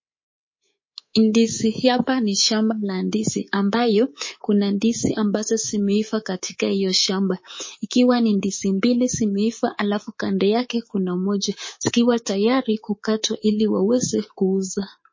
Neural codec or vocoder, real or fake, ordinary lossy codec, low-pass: codec, 24 kHz, 3.1 kbps, DualCodec; fake; MP3, 32 kbps; 7.2 kHz